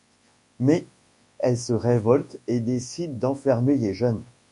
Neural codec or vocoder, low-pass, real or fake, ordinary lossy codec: codec, 24 kHz, 0.9 kbps, WavTokenizer, large speech release; 10.8 kHz; fake; MP3, 48 kbps